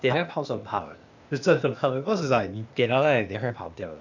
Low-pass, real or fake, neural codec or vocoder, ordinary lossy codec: 7.2 kHz; fake; codec, 16 kHz, 0.8 kbps, ZipCodec; none